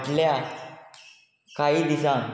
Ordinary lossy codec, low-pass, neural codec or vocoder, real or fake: none; none; none; real